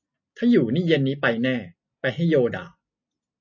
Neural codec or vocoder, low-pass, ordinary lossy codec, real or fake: none; 7.2 kHz; AAC, 48 kbps; real